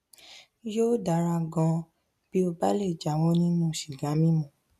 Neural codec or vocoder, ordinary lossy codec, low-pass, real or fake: none; none; 14.4 kHz; real